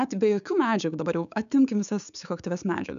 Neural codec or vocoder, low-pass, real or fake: codec, 16 kHz, 6 kbps, DAC; 7.2 kHz; fake